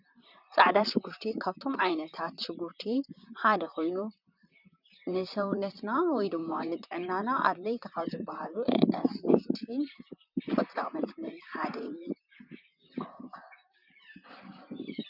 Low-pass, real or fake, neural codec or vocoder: 5.4 kHz; fake; vocoder, 44.1 kHz, 128 mel bands, Pupu-Vocoder